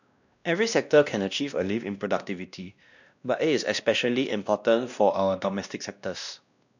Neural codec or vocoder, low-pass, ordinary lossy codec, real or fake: codec, 16 kHz, 1 kbps, X-Codec, WavLM features, trained on Multilingual LibriSpeech; 7.2 kHz; none; fake